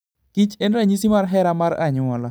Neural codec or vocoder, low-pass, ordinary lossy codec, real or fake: none; none; none; real